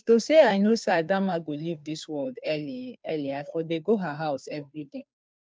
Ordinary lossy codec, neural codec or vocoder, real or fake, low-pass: none; codec, 16 kHz, 2 kbps, FunCodec, trained on Chinese and English, 25 frames a second; fake; none